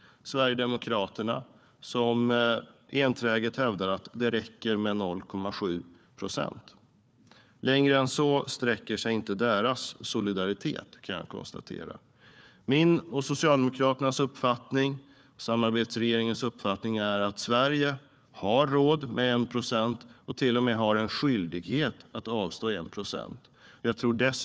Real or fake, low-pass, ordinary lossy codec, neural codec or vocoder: fake; none; none; codec, 16 kHz, 4 kbps, FunCodec, trained on Chinese and English, 50 frames a second